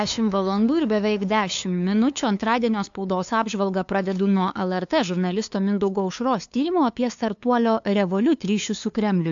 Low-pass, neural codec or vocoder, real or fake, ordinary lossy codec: 7.2 kHz; codec, 16 kHz, 2 kbps, FunCodec, trained on LibriTTS, 25 frames a second; fake; AAC, 64 kbps